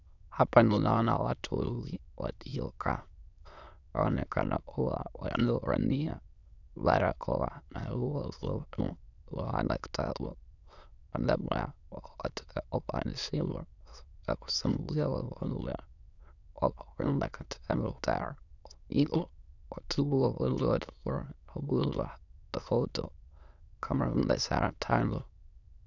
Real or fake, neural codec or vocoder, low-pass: fake; autoencoder, 22.05 kHz, a latent of 192 numbers a frame, VITS, trained on many speakers; 7.2 kHz